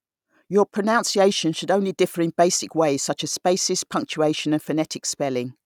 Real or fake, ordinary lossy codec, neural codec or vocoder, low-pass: real; none; none; 19.8 kHz